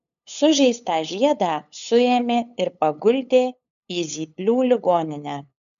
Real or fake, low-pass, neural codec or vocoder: fake; 7.2 kHz; codec, 16 kHz, 8 kbps, FunCodec, trained on LibriTTS, 25 frames a second